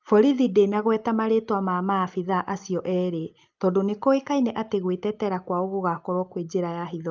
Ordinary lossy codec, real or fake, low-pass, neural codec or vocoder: Opus, 24 kbps; real; 7.2 kHz; none